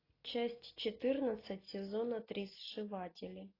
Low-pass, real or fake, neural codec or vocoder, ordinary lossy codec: 5.4 kHz; real; none; AAC, 24 kbps